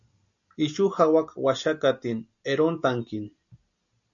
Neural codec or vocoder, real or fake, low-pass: none; real; 7.2 kHz